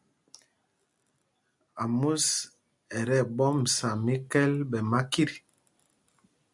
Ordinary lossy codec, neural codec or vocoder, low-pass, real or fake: MP3, 96 kbps; none; 10.8 kHz; real